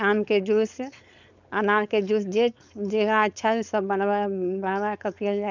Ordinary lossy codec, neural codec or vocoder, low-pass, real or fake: none; codec, 16 kHz, 4.8 kbps, FACodec; 7.2 kHz; fake